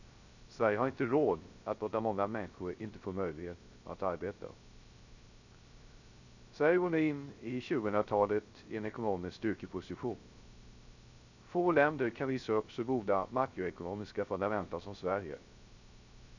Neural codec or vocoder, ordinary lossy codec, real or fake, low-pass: codec, 16 kHz, 0.3 kbps, FocalCodec; none; fake; 7.2 kHz